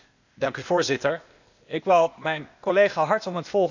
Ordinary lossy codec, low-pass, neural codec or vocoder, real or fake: none; 7.2 kHz; codec, 16 kHz, 0.8 kbps, ZipCodec; fake